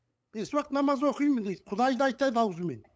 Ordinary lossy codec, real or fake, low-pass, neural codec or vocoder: none; fake; none; codec, 16 kHz, 8 kbps, FunCodec, trained on LibriTTS, 25 frames a second